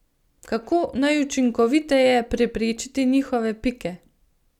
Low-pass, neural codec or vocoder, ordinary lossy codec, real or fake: 19.8 kHz; none; none; real